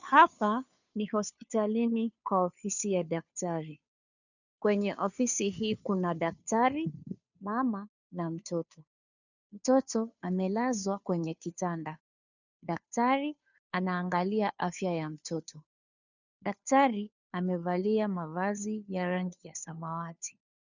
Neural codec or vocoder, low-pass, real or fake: codec, 16 kHz, 2 kbps, FunCodec, trained on Chinese and English, 25 frames a second; 7.2 kHz; fake